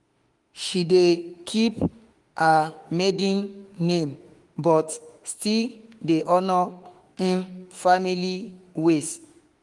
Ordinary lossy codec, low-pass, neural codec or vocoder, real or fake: Opus, 24 kbps; 10.8 kHz; autoencoder, 48 kHz, 32 numbers a frame, DAC-VAE, trained on Japanese speech; fake